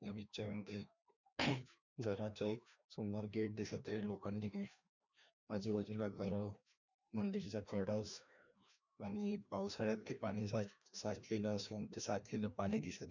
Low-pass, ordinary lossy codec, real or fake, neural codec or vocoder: 7.2 kHz; none; fake; codec, 16 kHz, 1 kbps, FreqCodec, larger model